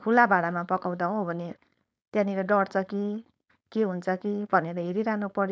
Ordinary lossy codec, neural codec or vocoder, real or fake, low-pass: none; codec, 16 kHz, 4.8 kbps, FACodec; fake; none